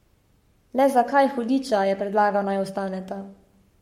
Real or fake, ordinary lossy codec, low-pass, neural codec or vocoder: fake; MP3, 64 kbps; 19.8 kHz; codec, 44.1 kHz, 7.8 kbps, Pupu-Codec